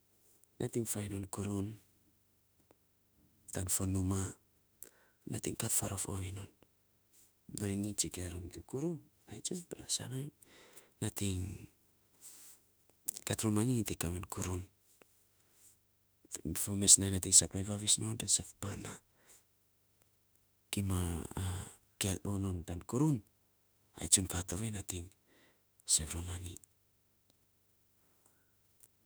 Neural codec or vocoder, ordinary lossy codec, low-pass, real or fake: autoencoder, 48 kHz, 32 numbers a frame, DAC-VAE, trained on Japanese speech; none; none; fake